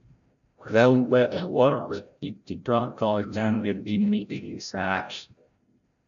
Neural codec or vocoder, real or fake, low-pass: codec, 16 kHz, 0.5 kbps, FreqCodec, larger model; fake; 7.2 kHz